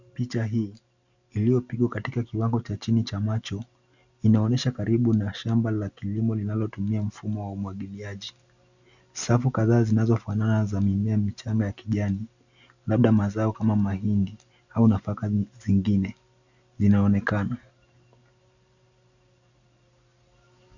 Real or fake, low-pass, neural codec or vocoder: real; 7.2 kHz; none